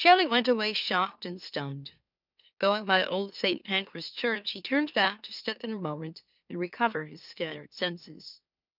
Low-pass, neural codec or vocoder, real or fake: 5.4 kHz; autoencoder, 44.1 kHz, a latent of 192 numbers a frame, MeloTTS; fake